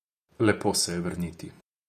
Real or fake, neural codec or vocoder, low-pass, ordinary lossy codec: fake; vocoder, 48 kHz, 128 mel bands, Vocos; 14.4 kHz; none